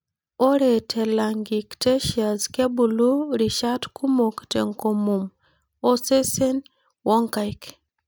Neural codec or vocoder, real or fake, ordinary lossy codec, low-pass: none; real; none; none